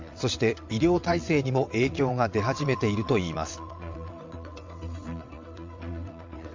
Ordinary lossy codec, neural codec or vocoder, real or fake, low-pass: MP3, 64 kbps; vocoder, 22.05 kHz, 80 mel bands, WaveNeXt; fake; 7.2 kHz